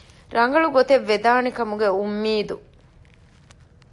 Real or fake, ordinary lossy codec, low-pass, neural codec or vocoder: real; AAC, 64 kbps; 10.8 kHz; none